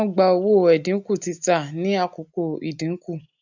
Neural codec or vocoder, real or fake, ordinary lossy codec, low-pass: none; real; none; 7.2 kHz